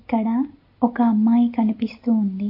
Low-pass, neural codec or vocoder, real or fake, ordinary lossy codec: 5.4 kHz; none; real; AAC, 32 kbps